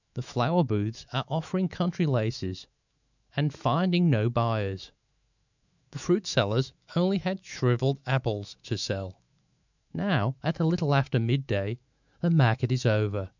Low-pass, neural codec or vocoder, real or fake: 7.2 kHz; autoencoder, 48 kHz, 128 numbers a frame, DAC-VAE, trained on Japanese speech; fake